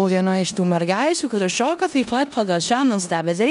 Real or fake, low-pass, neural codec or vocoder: fake; 10.8 kHz; codec, 16 kHz in and 24 kHz out, 0.9 kbps, LongCat-Audio-Codec, four codebook decoder